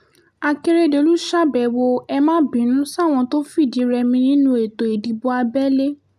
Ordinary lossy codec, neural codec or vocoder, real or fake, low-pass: none; none; real; 14.4 kHz